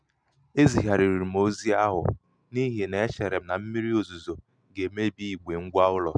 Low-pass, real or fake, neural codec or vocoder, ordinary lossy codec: 9.9 kHz; real; none; none